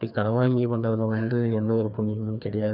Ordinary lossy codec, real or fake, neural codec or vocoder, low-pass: none; fake; codec, 16 kHz, 2 kbps, FreqCodec, larger model; 5.4 kHz